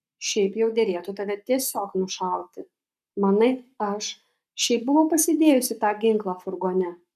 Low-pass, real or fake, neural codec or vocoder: 14.4 kHz; fake; codec, 44.1 kHz, 7.8 kbps, Pupu-Codec